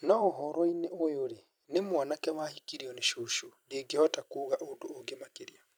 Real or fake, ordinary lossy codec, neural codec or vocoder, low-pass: fake; none; vocoder, 44.1 kHz, 128 mel bands every 256 samples, BigVGAN v2; none